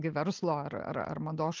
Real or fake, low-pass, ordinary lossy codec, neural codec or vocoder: real; 7.2 kHz; Opus, 24 kbps; none